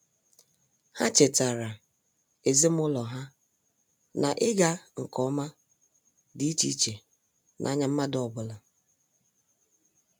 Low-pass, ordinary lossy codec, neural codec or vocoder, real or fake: none; none; none; real